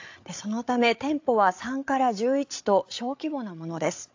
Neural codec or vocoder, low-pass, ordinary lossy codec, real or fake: codec, 16 kHz, 8 kbps, FreqCodec, larger model; 7.2 kHz; AAC, 48 kbps; fake